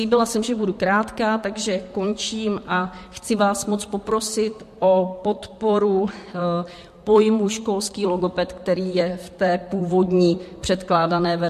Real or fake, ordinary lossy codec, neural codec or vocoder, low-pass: fake; MP3, 64 kbps; vocoder, 44.1 kHz, 128 mel bands, Pupu-Vocoder; 14.4 kHz